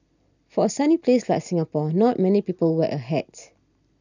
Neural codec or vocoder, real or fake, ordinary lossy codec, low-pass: none; real; none; 7.2 kHz